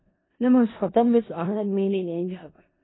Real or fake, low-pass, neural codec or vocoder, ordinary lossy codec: fake; 7.2 kHz; codec, 16 kHz in and 24 kHz out, 0.4 kbps, LongCat-Audio-Codec, four codebook decoder; AAC, 16 kbps